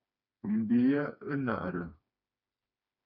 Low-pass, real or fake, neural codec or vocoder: 5.4 kHz; fake; codec, 16 kHz, 2 kbps, FreqCodec, smaller model